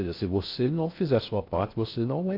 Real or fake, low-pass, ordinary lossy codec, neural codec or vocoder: fake; 5.4 kHz; AAC, 32 kbps; codec, 16 kHz in and 24 kHz out, 0.6 kbps, FocalCodec, streaming, 4096 codes